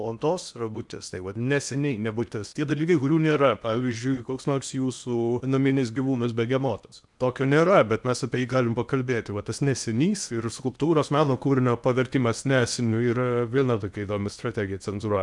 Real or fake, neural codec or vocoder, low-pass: fake; codec, 16 kHz in and 24 kHz out, 0.8 kbps, FocalCodec, streaming, 65536 codes; 10.8 kHz